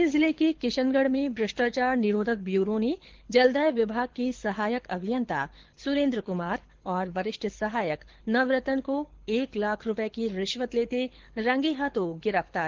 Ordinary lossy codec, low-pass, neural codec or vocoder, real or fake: Opus, 16 kbps; 7.2 kHz; codec, 24 kHz, 6 kbps, HILCodec; fake